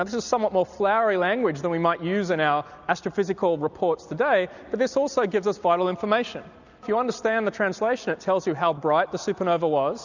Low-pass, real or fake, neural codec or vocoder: 7.2 kHz; real; none